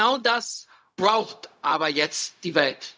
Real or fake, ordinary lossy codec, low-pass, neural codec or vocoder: fake; none; none; codec, 16 kHz, 0.4 kbps, LongCat-Audio-Codec